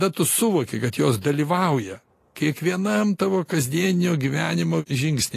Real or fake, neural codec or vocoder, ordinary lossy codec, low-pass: real; none; AAC, 48 kbps; 14.4 kHz